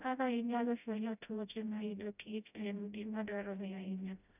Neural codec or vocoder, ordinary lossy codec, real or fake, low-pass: codec, 16 kHz, 0.5 kbps, FreqCodec, smaller model; none; fake; 3.6 kHz